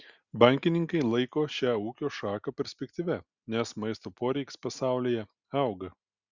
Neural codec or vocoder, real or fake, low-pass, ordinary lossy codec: none; real; 7.2 kHz; Opus, 64 kbps